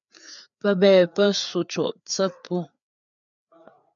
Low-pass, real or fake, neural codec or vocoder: 7.2 kHz; fake; codec, 16 kHz, 4 kbps, FreqCodec, larger model